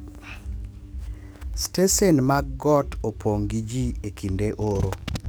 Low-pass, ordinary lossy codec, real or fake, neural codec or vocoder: none; none; fake; codec, 44.1 kHz, 7.8 kbps, DAC